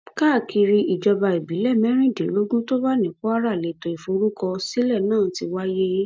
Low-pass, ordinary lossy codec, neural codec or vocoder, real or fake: none; none; none; real